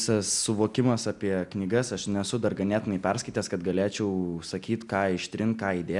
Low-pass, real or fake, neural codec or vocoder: 10.8 kHz; real; none